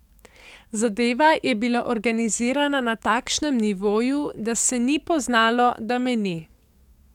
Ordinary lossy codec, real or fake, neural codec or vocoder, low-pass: none; fake; codec, 44.1 kHz, 7.8 kbps, DAC; 19.8 kHz